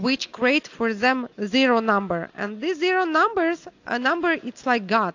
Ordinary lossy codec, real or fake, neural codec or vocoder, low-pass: AAC, 48 kbps; real; none; 7.2 kHz